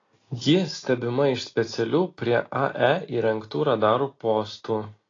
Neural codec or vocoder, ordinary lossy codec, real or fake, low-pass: none; AAC, 32 kbps; real; 7.2 kHz